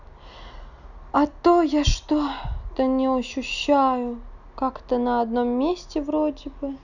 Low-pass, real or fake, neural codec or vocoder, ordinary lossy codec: 7.2 kHz; real; none; none